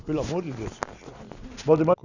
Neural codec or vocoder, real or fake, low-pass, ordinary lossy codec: none; real; 7.2 kHz; none